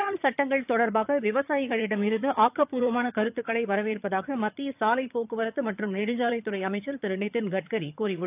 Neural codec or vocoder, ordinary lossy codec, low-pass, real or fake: vocoder, 22.05 kHz, 80 mel bands, HiFi-GAN; AAC, 32 kbps; 3.6 kHz; fake